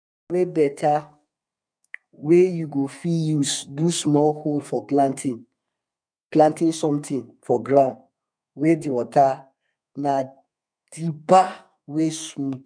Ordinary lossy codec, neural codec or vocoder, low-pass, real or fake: none; codec, 32 kHz, 1.9 kbps, SNAC; 9.9 kHz; fake